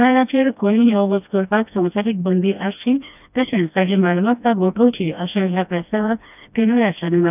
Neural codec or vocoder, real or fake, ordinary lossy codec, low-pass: codec, 16 kHz, 1 kbps, FreqCodec, smaller model; fake; none; 3.6 kHz